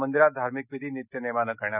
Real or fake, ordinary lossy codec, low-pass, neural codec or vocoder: real; none; 3.6 kHz; none